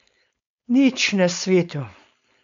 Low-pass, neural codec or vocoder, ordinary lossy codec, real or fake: 7.2 kHz; codec, 16 kHz, 4.8 kbps, FACodec; MP3, 64 kbps; fake